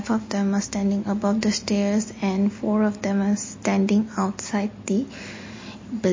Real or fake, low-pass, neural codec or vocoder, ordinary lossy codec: real; 7.2 kHz; none; MP3, 32 kbps